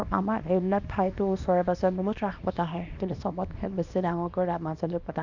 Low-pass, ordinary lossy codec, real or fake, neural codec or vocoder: 7.2 kHz; none; fake; codec, 24 kHz, 0.9 kbps, WavTokenizer, small release